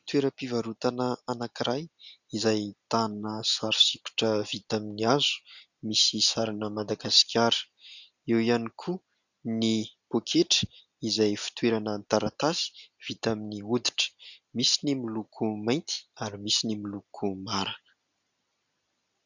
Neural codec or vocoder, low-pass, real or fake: none; 7.2 kHz; real